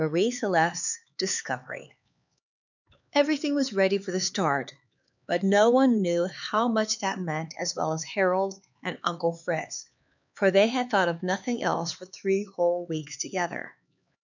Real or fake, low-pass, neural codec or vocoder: fake; 7.2 kHz; codec, 16 kHz, 4 kbps, X-Codec, HuBERT features, trained on LibriSpeech